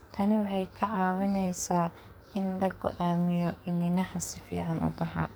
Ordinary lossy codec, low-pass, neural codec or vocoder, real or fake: none; none; codec, 44.1 kHz, 2.6 kbps, SNAC; fake